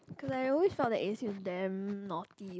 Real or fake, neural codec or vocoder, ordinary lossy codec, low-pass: real; none; none; none